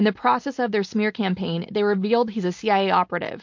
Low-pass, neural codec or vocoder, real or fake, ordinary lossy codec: 7.2 kHz; none; real; MP3, 48 kbps